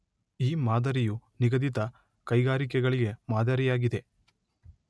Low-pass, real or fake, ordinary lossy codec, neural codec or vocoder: none; real; none; none